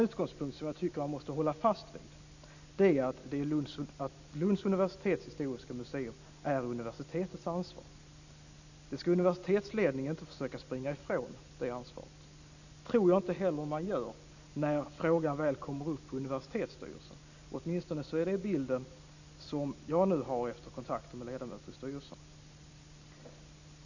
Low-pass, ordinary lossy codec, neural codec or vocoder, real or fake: 7.2 kHz; none; none; real